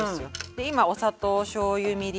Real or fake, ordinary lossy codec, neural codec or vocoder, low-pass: real; none; none; none